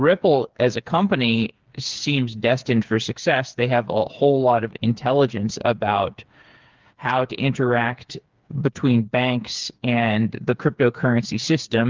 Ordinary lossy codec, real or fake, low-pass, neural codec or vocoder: Opus, 32 kbps; fake; 7.2 kHz; codec, 16 kHz, 4 kbps, FreqCodec, smaller model